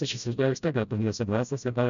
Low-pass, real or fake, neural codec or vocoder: 7.2 kHz; fake; codec, 16 kHz, 0.5 kbps, FreqCodec, smaller model